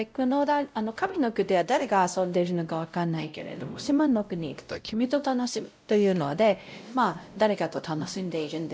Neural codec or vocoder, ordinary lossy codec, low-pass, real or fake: codec, 16 kHz, 0.5 kbps, X-Codec, WavLM features, trained on Multilingual LibriSpeech; none; none; fake